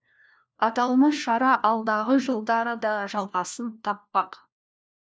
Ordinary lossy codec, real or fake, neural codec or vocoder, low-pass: none; fake; codec, 16 kHz, 1 kbps, FunCodec, trained on LibriTTS, 50 frames a second; none